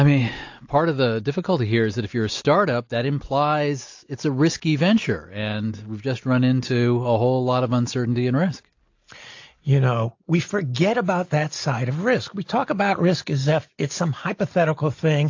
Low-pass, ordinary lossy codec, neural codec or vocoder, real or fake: 7.2 kHz; AAC, 48 kbps; none; real